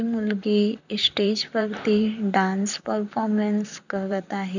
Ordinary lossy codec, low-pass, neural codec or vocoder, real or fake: none; 7.2 kHz; vocoder, 44.1 kHz, 128 mel bands, Pupu-Vocoder; fake